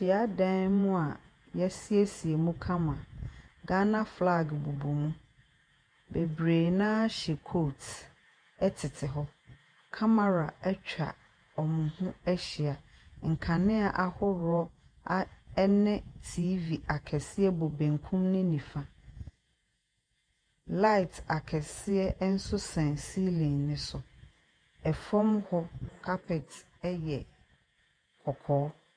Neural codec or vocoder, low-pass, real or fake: vocoder, 48 kHz, 128 mel bands, Vocos; 9.9 kHz; fake